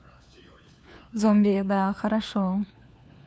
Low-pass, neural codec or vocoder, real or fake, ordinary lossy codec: none; codec, 16 kHz, 4 kbps, FunCodec, trained on LibriTTS, 50 frames a second; fake; none